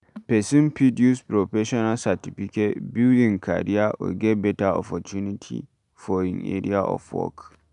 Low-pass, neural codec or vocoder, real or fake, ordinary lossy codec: 10.8 kHz; none; real; none